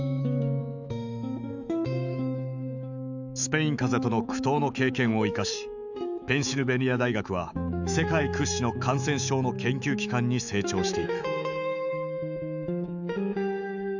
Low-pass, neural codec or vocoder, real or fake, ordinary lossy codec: 7.2 kHz; autoencoder, 48 kHz, 128 numbers a frame, DAC-VAE, trained on Japanese speech; fake; none